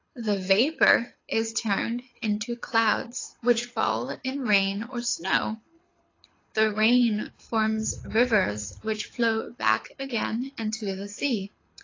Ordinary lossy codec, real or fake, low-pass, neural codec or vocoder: AAC, 32 kbps; fake; 7.2 kHz; codec, 24 kHz, 6 kbps, HILCodec